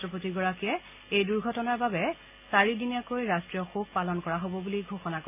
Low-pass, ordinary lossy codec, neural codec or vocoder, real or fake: 3.6 kHz; AAC, 32 kbps; none; real